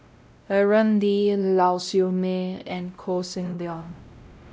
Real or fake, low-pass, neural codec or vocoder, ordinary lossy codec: fake; none; codec, 16 kHz, 0.5 kbps, X-Codec, WavLM features, trained on Multilingual LibriSpeech; none